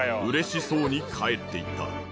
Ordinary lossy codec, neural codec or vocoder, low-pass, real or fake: none; none; none; real